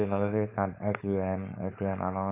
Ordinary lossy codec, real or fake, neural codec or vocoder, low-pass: Opus, 64 kbps; fake; codec, 16 kHz, 4.8 kbps, FACodec; 3.6 kHz